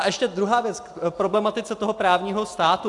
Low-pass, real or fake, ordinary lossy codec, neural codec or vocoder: 10.8 kHz; real; AAC, 64 kbps; none